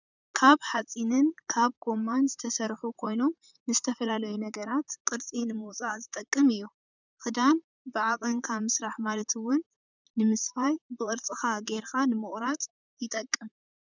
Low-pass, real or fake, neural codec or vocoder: 7.2 kHz; fake; vocoder, 44.1 kHz, 128 mel bands, Pupu-Vocoder